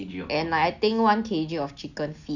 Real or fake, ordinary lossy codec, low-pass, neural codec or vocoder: real; none; 7.2 kHz; none